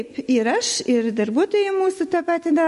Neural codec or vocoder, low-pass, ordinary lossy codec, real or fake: none; 14.4 kHz; MP3, 48 kbps; real